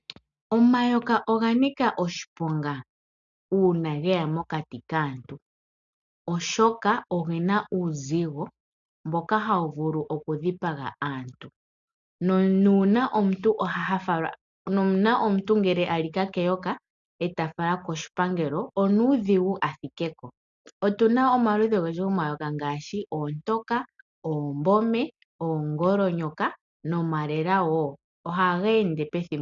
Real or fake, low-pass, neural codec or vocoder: real; 7.2 kHz; none